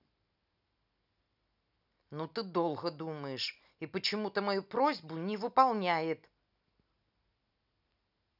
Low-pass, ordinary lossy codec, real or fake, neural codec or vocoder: 5.4 kHz; none; real; none